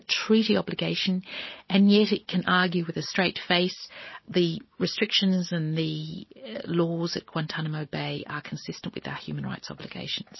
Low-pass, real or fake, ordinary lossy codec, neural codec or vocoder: 7.2 kHz; real; MP3, 24 kbps; none